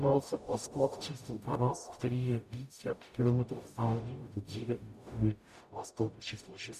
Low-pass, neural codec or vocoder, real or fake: 14.4 kHz; codec, 44.1 kHz, 0.9 kbps, DAC; fake